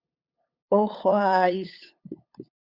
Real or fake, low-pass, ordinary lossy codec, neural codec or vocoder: fake; 5.4 kHz; Opus, 64 kbps; codec, 16 kHz, 8 kbps, FunCodec, trained on LibriTTS, 25 frames a second